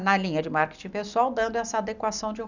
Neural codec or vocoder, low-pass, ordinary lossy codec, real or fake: none; 7.2 kHz; none; real